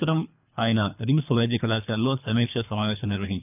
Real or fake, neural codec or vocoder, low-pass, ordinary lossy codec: fake; codec, 24 kHz, 3 kbps, HILCodec; 3.6 kHz; none